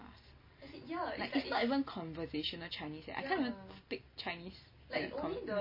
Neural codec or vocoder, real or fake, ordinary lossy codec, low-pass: none; real; MP3, 24 kbps; 5.4 kHz